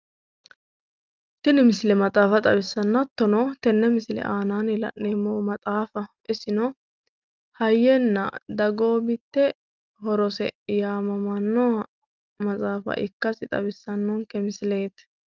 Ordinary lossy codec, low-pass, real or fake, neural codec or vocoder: Opus, 24 kbps; 7.2 kHz; real; none